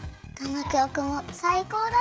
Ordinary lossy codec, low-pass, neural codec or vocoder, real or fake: none; none; codec, 16 kHz, 16 kbps, FreqCodec, smaller model; fake